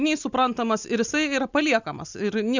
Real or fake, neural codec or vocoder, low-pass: real; none; 7.2 kHz